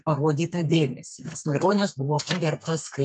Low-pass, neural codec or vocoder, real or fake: 10.8 kHz; codec, 32 kHz, 1.9 kbps, SNAC; fake